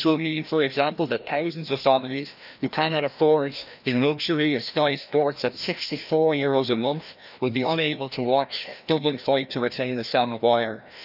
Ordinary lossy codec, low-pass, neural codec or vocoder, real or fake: none; 5.4 kHz; codec, 16 kHz, 1 kbps, FreqCodec, larger model; fake